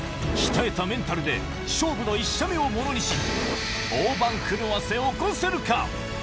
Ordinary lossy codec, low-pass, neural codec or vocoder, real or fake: none; none; none; real